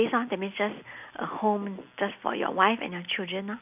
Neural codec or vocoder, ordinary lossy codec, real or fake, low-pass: none; none; real; 3.6 kHz